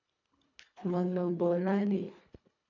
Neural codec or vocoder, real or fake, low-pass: codec, 24 kHz, 1.5 kbps, HILCodec; fake; 7.2 kHz